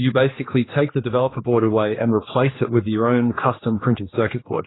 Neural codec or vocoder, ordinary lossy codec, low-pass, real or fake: codec, 16 kHz, 2 kbps, X-Codec, HuBERT features, trained on general audio; AAC, 16 kbps; 7.2 kHz; fake